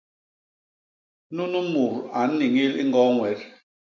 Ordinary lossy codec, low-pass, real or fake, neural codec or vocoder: MP3, 64 kbps; 7.2 kHz; real; none